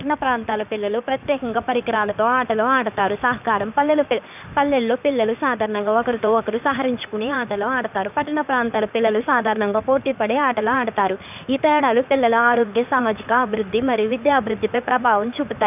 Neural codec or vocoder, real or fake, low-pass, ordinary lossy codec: codec, 16 kHz in and 24 kHz out, 2.2 kbps, FireRedTTS-2 codec; fake; 3.6 kHz; none